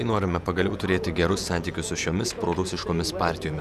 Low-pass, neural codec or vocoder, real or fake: 14.4 kHz; vocoder, 48 kHz, 128 mel bands, Vocos; fake